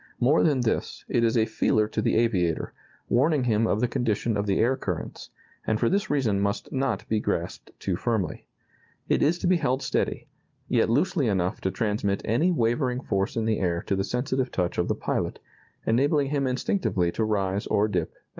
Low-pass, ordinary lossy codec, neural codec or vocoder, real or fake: 7.2 kHz; Opus, 24 kbps; none; real